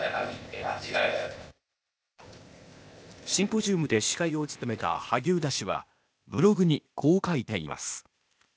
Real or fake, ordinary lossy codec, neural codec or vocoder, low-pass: fake; none; codec, 16 kHz, 0.8 kbps, ZipCodec; none